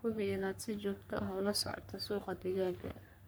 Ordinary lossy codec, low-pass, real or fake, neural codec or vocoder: none; none; fake; codec, 44.1 kHz, 3.4 kbps, Pupu-Codec